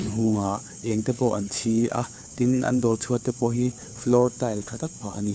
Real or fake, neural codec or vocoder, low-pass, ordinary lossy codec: fake; codec, 16 kHz, 4 kbps, FunCodec, trained on LibriTTS, 50 frames a second; none; none